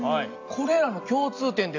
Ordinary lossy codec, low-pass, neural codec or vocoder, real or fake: AAC, 48 kbps; 7.2 kHz; none; real